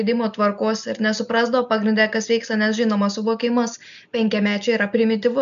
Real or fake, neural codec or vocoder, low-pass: real; none; 7.2 kHz